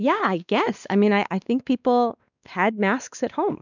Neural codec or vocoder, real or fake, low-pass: codec, 16 kHz, 2 kbps, X-Codec, WavLM features, trained on Multilingual LibriSpeech; fake; 7.2 kHz